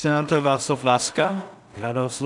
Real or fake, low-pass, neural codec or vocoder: fake; 10.8 kHz; codec, 16 kHz in and 24 kHz out, 0.4 kbps, LongCat-Audio-Codec, two codebook decoder